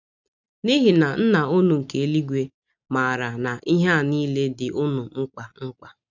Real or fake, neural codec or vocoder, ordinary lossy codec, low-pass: real; none; none; 7.2 kHz